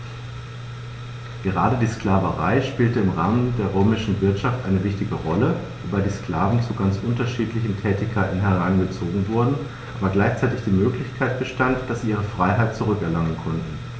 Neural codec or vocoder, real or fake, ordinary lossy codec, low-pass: none; real; none; none